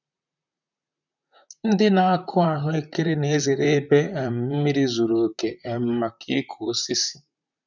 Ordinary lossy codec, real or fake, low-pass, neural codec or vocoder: none; fake; 7.2 kHz; vocoder, 44.1 kHz, 128 mel bands, Pupu-Vocoder